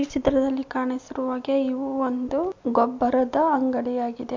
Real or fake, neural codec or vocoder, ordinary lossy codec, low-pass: real; none; MP3, 48 kbps; 7.2 kHz